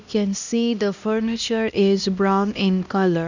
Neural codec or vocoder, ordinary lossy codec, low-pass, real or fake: codec, 16 kHz, 1 kbps, X-Codec, HuBERT features, trained on LibriSpeech; none; 7.2 kHz; fake